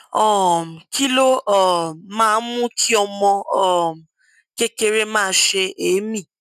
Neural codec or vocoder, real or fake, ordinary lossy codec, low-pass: none; real; none; 14.4 kHz